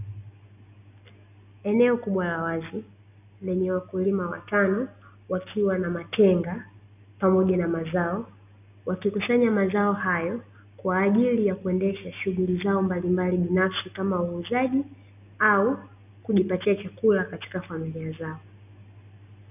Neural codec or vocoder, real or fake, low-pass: none; real; 3.6 kHz